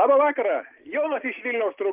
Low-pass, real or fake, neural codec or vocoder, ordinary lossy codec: 3.6 kHz; real; none; Opus, 32 kbps